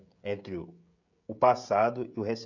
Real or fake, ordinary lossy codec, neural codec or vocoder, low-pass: fake; none; codec, 16 kHz, 16 kbps, FreqCodec, smaller model; 7.2 kHz